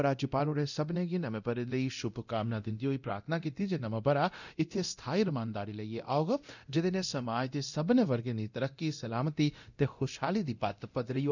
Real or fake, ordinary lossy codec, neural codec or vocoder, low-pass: fake; none; codec, 24 kHz, 0.9 kbps, DualCodec; 7.2 kHz